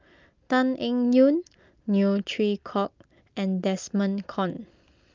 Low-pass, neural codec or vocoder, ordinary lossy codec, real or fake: 7.2 kHz; none; Opus, 24 kbps; real